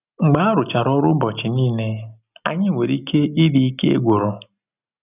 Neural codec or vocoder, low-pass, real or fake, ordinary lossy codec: none; 3.6 kHz; real; none